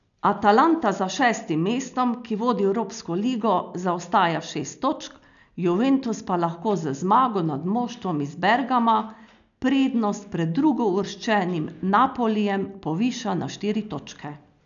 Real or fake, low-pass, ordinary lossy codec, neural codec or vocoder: real; 7.2 kHz; none; none